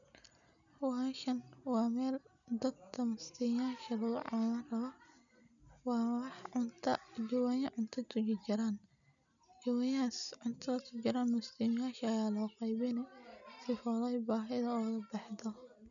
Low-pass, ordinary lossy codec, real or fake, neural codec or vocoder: 7.2 kHz; none; real; none